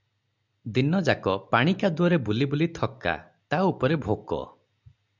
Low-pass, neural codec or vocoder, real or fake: 7.2 kHz; none; real